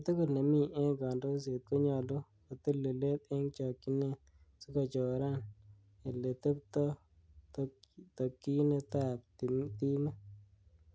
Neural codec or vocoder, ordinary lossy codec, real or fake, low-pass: none; none; real; none